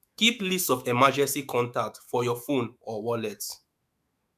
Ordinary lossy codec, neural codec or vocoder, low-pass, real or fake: MP3, 96 kbps; autoencoder, 48 kHz, 128 numbers a frame, DAC-VAE, trained on Japanese speech; 14.4 kHz; fake